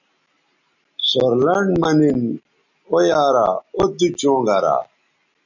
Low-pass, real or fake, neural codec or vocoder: 7.2 kHz; real; none